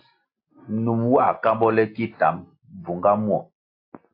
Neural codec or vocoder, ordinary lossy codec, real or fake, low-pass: none; AAC, 32 kbps; real; 5.4 kHz